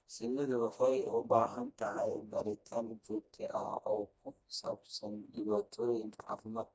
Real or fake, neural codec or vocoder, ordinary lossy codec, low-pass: fake; codec, 16 kHz, 1 kbps, FreqCodec, smaller model; none; none